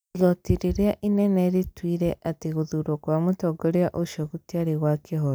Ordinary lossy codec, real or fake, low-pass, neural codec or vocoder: none; real; none; none